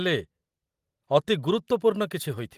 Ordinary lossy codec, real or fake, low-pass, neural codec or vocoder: Opus, 24 kbps; real; 14.4 kHz; none